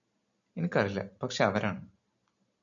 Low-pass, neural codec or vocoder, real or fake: 7.2 kHz; none; real